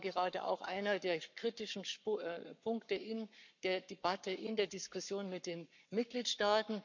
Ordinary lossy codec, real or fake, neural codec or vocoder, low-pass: none; fake; codec, 44.1 kHz, 7.8 kbps, Pupu-Codec; 7.2 kHz